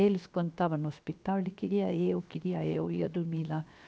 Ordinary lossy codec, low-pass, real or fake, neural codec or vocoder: none; none; fake; codec, 16 kHz, 0.7 kbps, FocalCodec